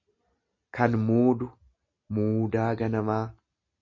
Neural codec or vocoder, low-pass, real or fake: none; 7.2 kHz; real